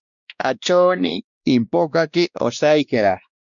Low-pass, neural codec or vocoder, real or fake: 7.2 kHz; codec, 16 kHz, 1 kbps, X-Codec, WavLM features, trained on Multilingual LibriSpeech; fake